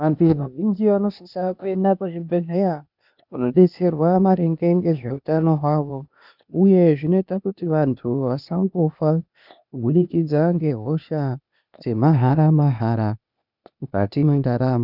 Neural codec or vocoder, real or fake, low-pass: codec, 16 kHz, 0.8 kbps, ZipCodec; fake; 5.4 kHz